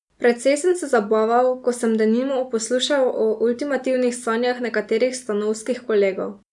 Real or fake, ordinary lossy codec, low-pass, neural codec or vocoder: real; none; 10.8 kHz; none